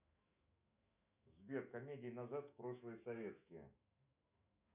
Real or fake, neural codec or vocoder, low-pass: fake; autoencoder, 48 kHz, 128 numbers a frame, DAC-VAE, trained on Japanese speech; 3.6 kHz